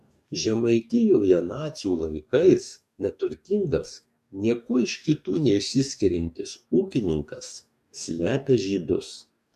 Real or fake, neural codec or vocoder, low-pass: fake; codec, 44.1 kHz, 2.6 kbps, DAC; 14.4 kHz